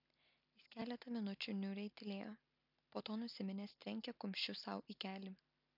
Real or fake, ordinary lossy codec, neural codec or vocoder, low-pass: real; MP3, 48 kbps; none; 5.4 kHz